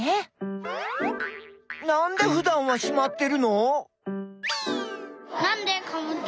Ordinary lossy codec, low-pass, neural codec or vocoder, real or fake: none; none; none; real